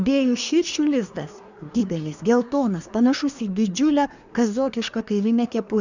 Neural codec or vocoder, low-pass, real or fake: codec, 24 kHz, 1 kbps, SNAC; 7.2 kHz; fake